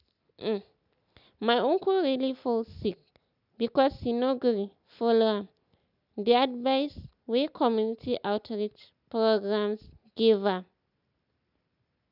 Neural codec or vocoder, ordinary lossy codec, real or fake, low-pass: none; none; real; 5.4 kHz